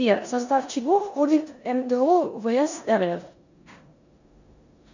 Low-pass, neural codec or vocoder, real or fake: 7.2 kHz; codec, 16 kHz in and 24 kHz out, 0.9 kbps, LongCat-Audio-Codec, four codebook decoder; fake